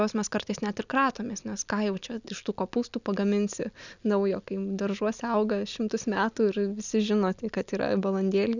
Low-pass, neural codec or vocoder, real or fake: 7.2 kHz; none; real